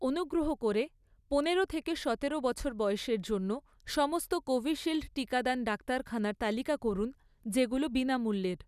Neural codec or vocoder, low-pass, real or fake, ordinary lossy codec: none; 14.4 kHz; real; none